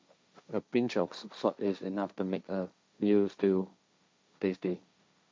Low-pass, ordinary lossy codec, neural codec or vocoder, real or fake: none; none; codec, 16 kHz, 1.1 kbps, Voila-Tokenizer; fake